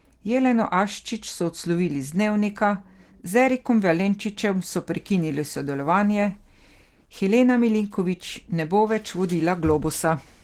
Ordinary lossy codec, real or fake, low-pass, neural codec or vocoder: Opus, 16 kbps; real; 19.8 kHz; none